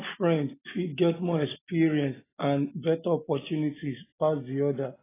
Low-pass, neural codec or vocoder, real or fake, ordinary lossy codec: 3.6 kHz; none; real; AAC, 16 kbps